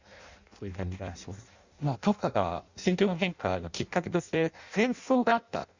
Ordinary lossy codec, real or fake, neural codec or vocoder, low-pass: Opus, 64 kbps; fake; codec, 16 kHz in and 24 kHz out, 0.6 kbps, FireRedTTS-2 codec; 7.2 kHz